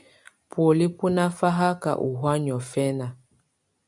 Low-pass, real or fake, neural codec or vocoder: 10.8 kHz; real; none